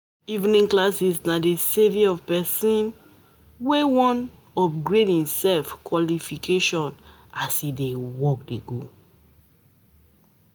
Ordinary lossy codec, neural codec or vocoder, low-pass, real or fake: none; none; none; real